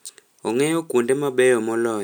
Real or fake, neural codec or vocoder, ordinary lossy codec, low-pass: real; none; none; none